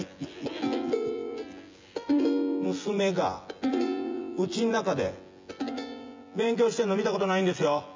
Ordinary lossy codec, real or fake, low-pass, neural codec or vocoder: MP3, 64 kbps; fake; 7.2 kHz; vocoder, 24 kHz, 100 mel bands, Vocos